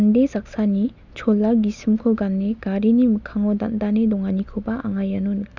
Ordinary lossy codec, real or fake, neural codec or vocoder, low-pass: none; real; none; 7.2 kHz